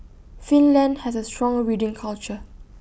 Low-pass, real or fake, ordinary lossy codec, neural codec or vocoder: none; real; none; none